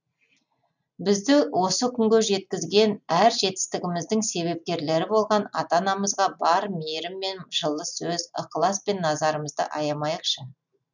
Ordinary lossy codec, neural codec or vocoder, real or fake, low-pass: none; none; real; 7.2 kHz